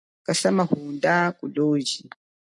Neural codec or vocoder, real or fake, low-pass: none; real; 10.8 kHz